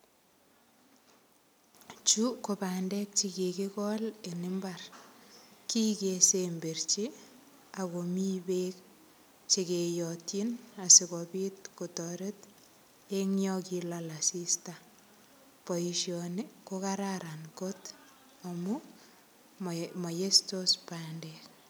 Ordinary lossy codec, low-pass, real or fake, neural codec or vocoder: none; none; real; none